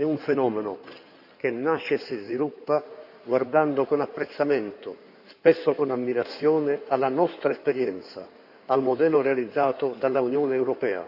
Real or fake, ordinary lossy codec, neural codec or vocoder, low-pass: fake; none; codec, 16 kHz in and 24 kHz out, 2.2 kbps, FireRedTTS-2 codec; 5.4 kHz